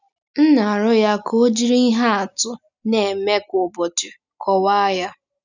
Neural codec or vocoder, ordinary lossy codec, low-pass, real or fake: none; none; 7.2 kHz; real